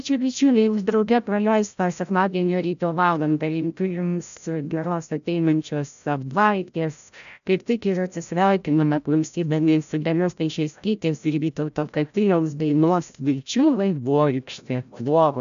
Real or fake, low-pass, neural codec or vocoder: fake; 7.2 kHz; codec, 16 kHz, 0.5 kbps, FreqCodec, larger model